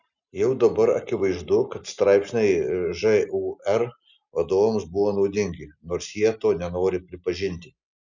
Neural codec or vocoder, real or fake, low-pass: none; real; 7.2 kHz